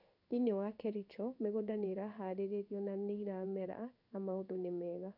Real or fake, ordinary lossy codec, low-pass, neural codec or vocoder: fake; none; 5.4 kHz; codec, 16 kHz in and 24 kHz out, 1 kbps, XY-Tokenizer